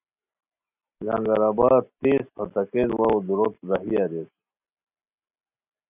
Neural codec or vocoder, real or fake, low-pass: none; real; 3.6 kHz